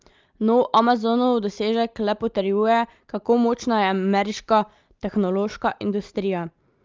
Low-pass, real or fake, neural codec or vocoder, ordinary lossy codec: 7.2 kHz; real; none; Opus, 32 kbps